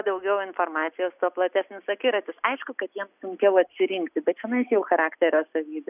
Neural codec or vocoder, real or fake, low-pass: none; real; 3.6 kHz